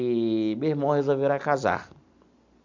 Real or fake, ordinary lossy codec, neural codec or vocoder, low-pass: real; MP3, 64 kbps; none; 7.2 kHz